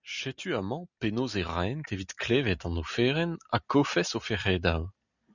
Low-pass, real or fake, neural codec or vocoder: 7.2 kHz; real; none